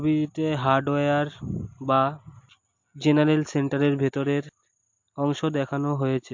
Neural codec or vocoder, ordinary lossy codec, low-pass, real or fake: none; MP3, 48 kbps; 7.2 kHz; real